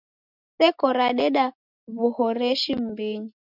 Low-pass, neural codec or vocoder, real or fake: 5.4 kHz; none; real